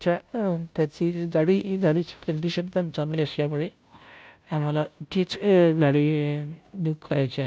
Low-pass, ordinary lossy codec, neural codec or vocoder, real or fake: none; none; codec, 16 kHz, 0.5 kbps, FunCodec, trained on Chinese and English, 25 frames a second; fake